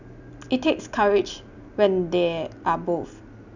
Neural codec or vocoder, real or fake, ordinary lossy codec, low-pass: none; real; none; 7.2 kHz